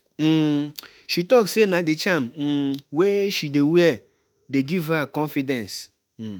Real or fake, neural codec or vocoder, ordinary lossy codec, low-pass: fake; autoencoder, 48 kHz, 32 numbers a frame, DAC-VAE, trained on Japanese speech; none; none